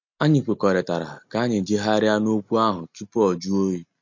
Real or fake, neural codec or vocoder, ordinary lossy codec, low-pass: real; none; MP3, 48 kbps; 7.2 kHz